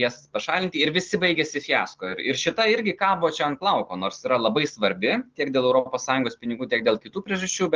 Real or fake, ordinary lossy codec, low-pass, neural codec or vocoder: real; Opus, 16 kbps; 7.2 kHz; none